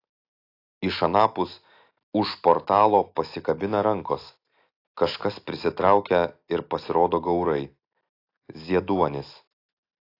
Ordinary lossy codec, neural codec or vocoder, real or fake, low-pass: AAC, 32 kbps; none; real; 5.4 kHz